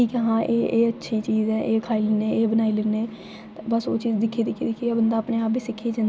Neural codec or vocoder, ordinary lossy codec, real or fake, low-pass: none; none; real; none